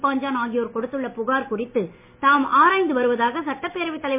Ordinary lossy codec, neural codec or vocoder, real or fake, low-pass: MP3, 24 kbps; none; real; 3.6 kHz